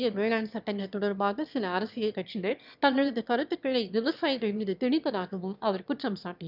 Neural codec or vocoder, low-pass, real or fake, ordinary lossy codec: autoencoder, 22.05 kHz, a latent of 192 numbers a frame, VITS, trained on one speaker; 5.4 kHz; fake; none